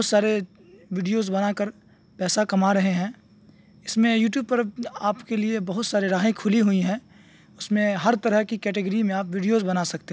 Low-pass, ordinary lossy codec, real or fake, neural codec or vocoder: none; none; real; none